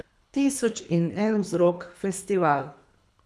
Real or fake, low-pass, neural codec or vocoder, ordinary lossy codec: fake; none; codec, 24 kHz, 3 kbps, HILCodec; none